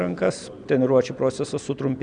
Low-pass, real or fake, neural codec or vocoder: 9.9 kHz; real; none